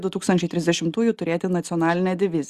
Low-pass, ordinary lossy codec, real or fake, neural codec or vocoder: 14.4 kHz; Opus, 64 kbps; real; none